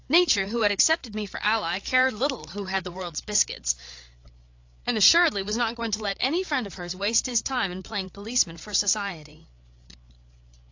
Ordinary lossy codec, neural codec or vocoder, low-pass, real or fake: AAC, 48 kbps; codec, 16 kHz, 8 kbps, FreqCodec, larger model; 7.2 kHz; fake